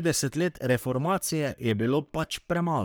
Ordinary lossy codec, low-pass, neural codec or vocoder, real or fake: none; none; codec, 44.1 kHz, 3.4 kbps, Pupu-Codec; fake